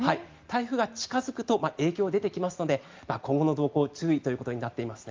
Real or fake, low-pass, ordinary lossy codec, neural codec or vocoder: real; 7.2 kHz; Opus, 24 kbps; none